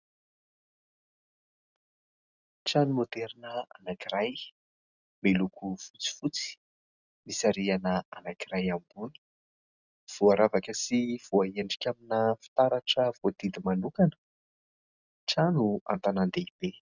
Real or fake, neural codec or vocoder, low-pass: real; none; 7.2 kHz